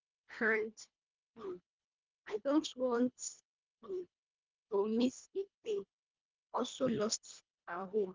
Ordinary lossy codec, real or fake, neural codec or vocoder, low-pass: Opus, 32 kbps; fake; codec, 24 kHz, 1.5 kbps, HILCodec; 7.2 kHz